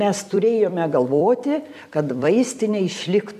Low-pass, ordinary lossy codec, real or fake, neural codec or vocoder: 14.4 kHz; AAC, 96 kbps; fake; vocoder, 44.1 kHz, 128 mel bands every 256 samples, BigVGAN v2